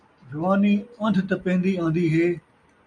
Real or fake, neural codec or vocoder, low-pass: real; none; 9.9 kHz